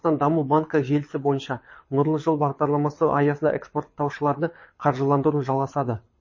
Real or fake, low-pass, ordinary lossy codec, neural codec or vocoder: fake; 7.2 kHz; MP3, 32 kbps; codec, 24 kHz, 6 kbps, HILCodec